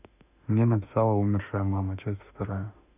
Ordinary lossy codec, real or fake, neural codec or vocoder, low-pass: none; fake; autoencoder, 48 kHz, 32 numbers a frame, DAC-VAE, trained on Japanese speech; 3.6 kHz